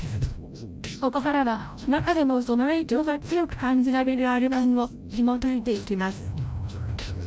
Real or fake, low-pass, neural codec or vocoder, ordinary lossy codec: fake; none; codec, 16 kHz, 0.5 kbps, FreqCodec, larger model; none